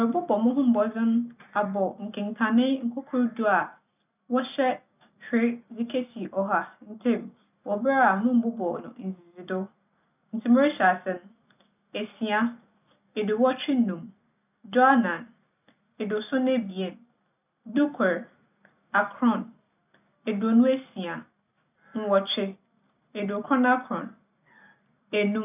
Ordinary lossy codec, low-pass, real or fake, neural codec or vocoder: none; 3.6 kHz; real; none